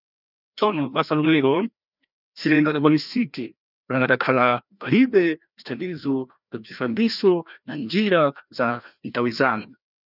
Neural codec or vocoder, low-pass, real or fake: codec, 16 kHz, 1 kbps, FreqCodec, larger model; 5.4 kHz; fake